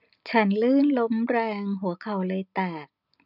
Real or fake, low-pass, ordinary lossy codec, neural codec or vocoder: real; 5.4 kHz; none; none